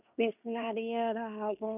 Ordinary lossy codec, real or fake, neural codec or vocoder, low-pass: none; fake; codec, 16 kHz in and 24 kHz out, 0.9 kbps, LongCat-Audio-Codec, fine tuned four codebook decoder; 3.6 kHz